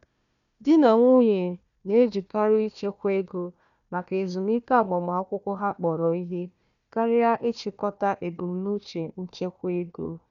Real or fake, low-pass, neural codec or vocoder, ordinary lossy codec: fake; 7.2 kHz; codec, 16 kHz, 1 kbps, FunCodec, trained on Chinese and English, 50 frames a second; none